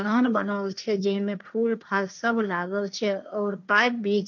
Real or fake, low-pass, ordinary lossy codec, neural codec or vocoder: fake; 7.2 kHz; none; codec, 16 kHz, 1.1 kbps, Voila-Tokenizer